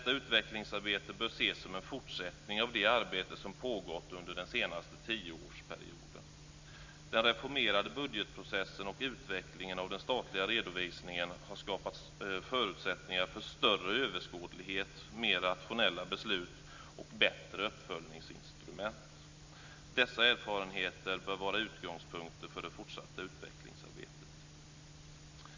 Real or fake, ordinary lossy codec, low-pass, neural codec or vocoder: real; MP3, 64 kbps; 7.2 kHz; none